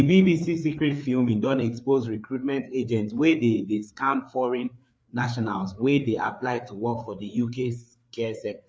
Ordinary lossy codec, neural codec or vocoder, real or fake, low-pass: none; codec, 16 kHz, 4 kbps, FreqCodec, larger model; fake; none